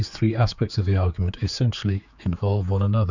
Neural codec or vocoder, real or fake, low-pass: codec, 16 kHz, 4 kbps, X-Codec, HuBERT features, trained on general audio; fake; 7.2 kHz